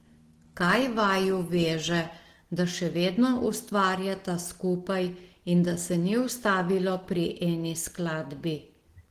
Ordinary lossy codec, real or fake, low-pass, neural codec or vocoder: Opus, 16 kbps; real; 14.4 kHz; none